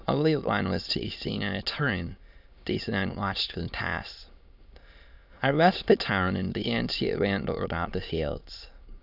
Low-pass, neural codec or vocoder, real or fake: 5.4 kHz; autoencoder, 22.05 kHz, a latent of 192 numbers a frame, VITS, trained on many speakers; fake